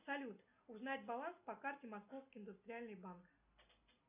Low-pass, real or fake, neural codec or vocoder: 3.6 kHz; real; none